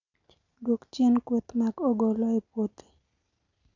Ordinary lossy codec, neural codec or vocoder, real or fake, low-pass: none; none; real; 7.2 kHz